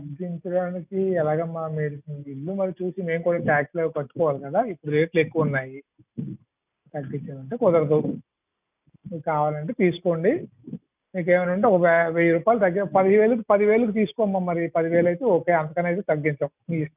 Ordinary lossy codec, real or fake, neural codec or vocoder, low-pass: none; real; none; 3.6 kHz